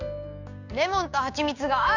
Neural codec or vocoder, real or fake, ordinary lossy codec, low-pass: codec, 16 kHz, 6 kbps, DAC; fake; none; 7.2 kHz